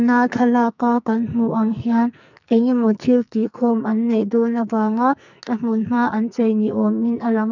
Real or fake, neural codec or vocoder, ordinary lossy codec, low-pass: fake; codec, 44.1 kHz, 2.6 kbps, SNAC; none; 7.2 kHz